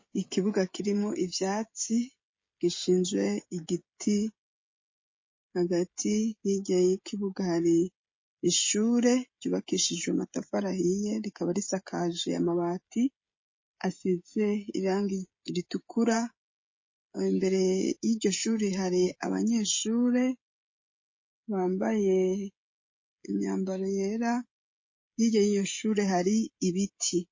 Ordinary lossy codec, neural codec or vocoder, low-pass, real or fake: MP3, 32 kbps; codec, 16 kHz, 16 kbps, FreqCodec, smaller model; 7.2 kHz; fake